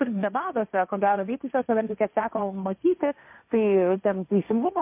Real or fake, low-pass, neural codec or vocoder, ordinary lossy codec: fake; 3.6 kHz; codec, 16 kHz, 1.1 kbps, Voila-Tokenizer; MP3, 32 kbps